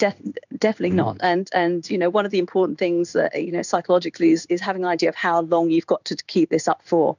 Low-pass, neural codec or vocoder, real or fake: 7.2 kHz; codec, 16 kHz in and 24 kHz out, 1 kbps, XY-Tokenizer; fake